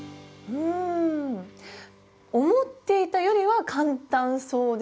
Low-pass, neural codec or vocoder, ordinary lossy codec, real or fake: none; none; none; real